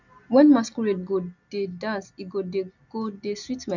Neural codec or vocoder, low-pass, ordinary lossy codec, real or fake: none; 7.2 kHz; none; real